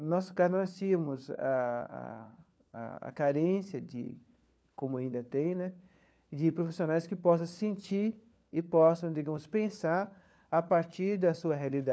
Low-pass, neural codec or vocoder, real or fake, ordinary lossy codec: none; codec, 16 kHz, 4 kbps, FunCodec, trained on LibriTTS, 50 frames a second; fake; none